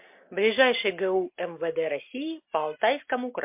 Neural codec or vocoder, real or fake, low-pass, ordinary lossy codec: none; real; 3.6 kHz; MP3, 32 kbps